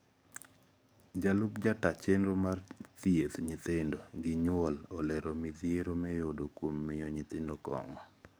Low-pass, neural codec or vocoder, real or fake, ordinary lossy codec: none; codec, 44.1 kHz, 7.8 kbps, DAC; fake; none